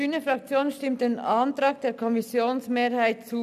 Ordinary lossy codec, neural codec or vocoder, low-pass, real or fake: none; none; 14.4 kHz; real